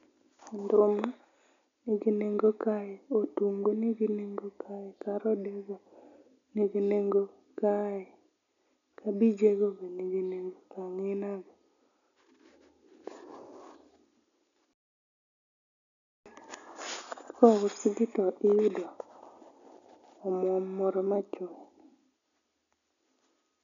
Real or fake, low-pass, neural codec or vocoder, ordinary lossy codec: real; 7.2 kHz; none; none